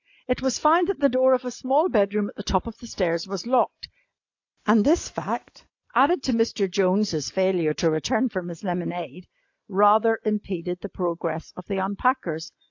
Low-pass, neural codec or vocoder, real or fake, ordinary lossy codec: 7.2 kHz; none; real; AAC, 48 kbps